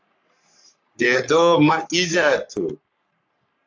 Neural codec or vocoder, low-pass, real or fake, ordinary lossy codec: codec, 44.1 kHz, 3.4 kbps, Pupu-Codec; 7.2 kHz; fake; AAC, 48 kbps